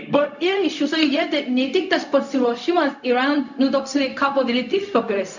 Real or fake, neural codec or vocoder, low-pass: fake; codec, 16 kHz, 0.4 kbps, LongCat-Audio-Codec; 7.2 kHz